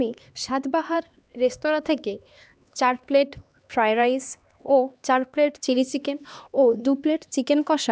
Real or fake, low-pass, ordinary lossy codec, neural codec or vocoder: fake; none; none; codec, 16 kHz, 2 kbps, X-Codec, HuBERT features, trained on LibriSpeech